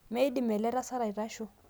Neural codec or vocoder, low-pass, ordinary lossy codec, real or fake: none; none; none; real